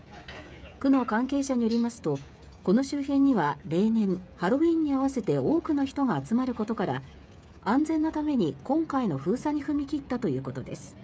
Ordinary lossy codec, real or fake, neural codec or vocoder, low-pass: none; fake; codec, 16 kHz, 16 kbps, FreqCodec, smaller model; none